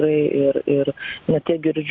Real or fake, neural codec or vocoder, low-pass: real; none; 7.2 kHz